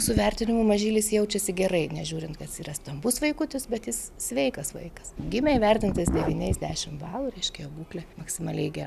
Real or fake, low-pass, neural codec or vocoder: real; 14.4 kHz; none